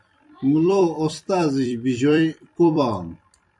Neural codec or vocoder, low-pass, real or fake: vocoder, 44.1 kHz, 128 mel bands every 256 samples, BigVGAN v2; 10.8 kHz; fake